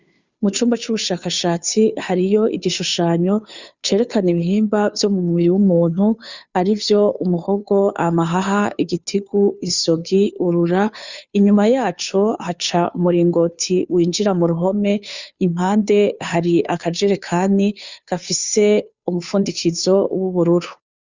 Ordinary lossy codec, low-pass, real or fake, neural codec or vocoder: Opus, 64 kbps; 7.2 kHz; fake; codec, 16 kHz, 2 kbps, FunCodec, trained on Chinese and English, 25 frames a second